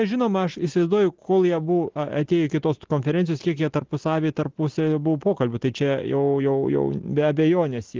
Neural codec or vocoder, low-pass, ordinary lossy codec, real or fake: none; 7.2 kHz; Opus, 16 kbps; real